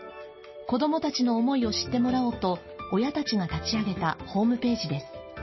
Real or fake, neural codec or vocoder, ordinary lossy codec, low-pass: real; none; MP3, 24 kbps; 7.2 kHz